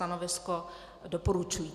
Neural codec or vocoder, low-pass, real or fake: vocoder, 44.1 kHz, 128 mel bands every 256 samples, BigVGAN v2; 14.4 kHz; fake